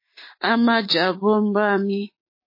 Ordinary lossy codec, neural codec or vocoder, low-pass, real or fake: MP3, 24 kbps; codec, 16 kHz, 6 kbps, DAC; 5.4 kHz; fake